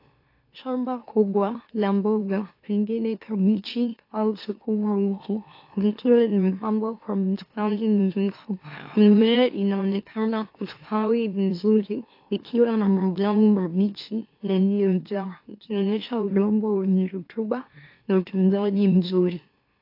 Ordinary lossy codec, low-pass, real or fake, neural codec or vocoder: AAC, 32 kbps; 5.4 kHz; fake; autoencoder, 44.1 kHz, a latent of 192 numbers a frame, MeloTTS